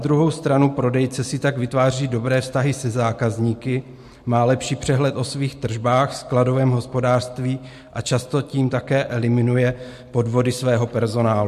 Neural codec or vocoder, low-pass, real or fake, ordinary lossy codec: none; 14.4 kHz; real; MP3, 64 kbps